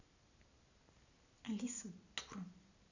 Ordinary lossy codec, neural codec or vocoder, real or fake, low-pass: none; none; real; 7.2 kHz